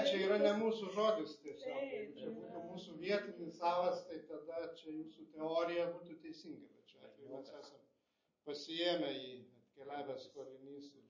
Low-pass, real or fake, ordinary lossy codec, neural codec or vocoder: 7.2 kHz; real; MP3, 32 kbps; none